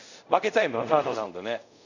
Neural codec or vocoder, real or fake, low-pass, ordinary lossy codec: codec, 24 kHz, 0.5 kbps, DualCodec; fake; 7.2 kHz; none